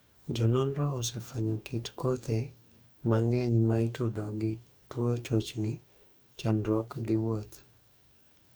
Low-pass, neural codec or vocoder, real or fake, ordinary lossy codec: none; codec, 44.1 kHz, 2.6 kbps, DAC; fake; none